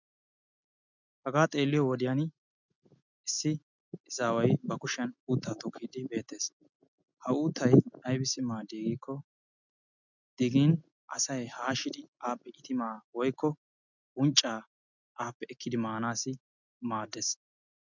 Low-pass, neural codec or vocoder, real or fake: 7.2 kHz; none; real